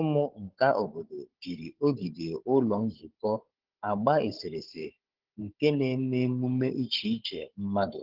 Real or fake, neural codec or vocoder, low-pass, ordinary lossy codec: fake; codec, 16 kHz, 16 kbps, FunCodec, trained on Chinese and English, 50 frames a second; 5.4 kHz; Opus, 16 kbps